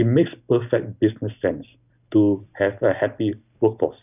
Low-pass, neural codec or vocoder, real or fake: 3.6 kHz; none; real